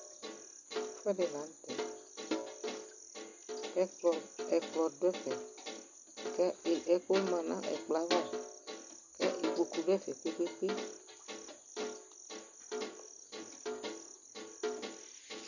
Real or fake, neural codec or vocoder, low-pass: real; none; 7.2 kHz